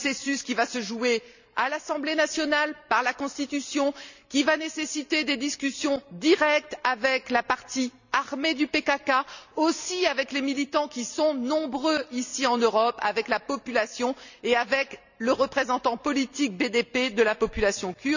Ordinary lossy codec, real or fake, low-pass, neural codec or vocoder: none; real; 7.2 kHz; none